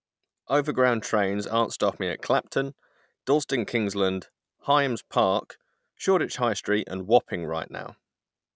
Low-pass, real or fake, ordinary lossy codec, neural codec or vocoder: none; real; none; none